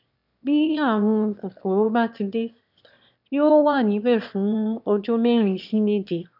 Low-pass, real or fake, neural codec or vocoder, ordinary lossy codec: 5.4 kHz; fake; autoencoder, 22.05 kHz, a latent of 192 numbers a frame, VITS, trained on one speaker; none